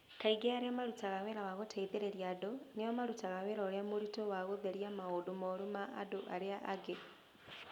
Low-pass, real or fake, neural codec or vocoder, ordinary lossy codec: 19.8 kHz; real; none; none